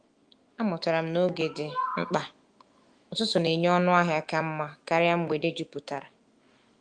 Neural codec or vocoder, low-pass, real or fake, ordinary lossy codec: autoencoder, 48 kHz, 128 numbers a frame, DAC-VAE, trained on Japanese speech; 9.9 kHz; fake; Opus, 24 kbps